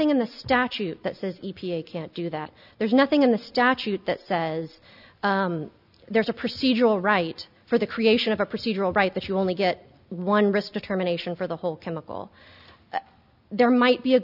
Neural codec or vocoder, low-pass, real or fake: none; 5.4 kHz; real